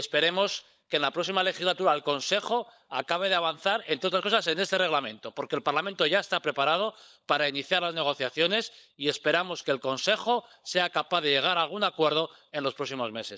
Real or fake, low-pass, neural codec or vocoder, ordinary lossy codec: fake; none; codec, 16 kHz, 16 kbps, FunCodec, trained on LibriTTS, 50 frames a second; none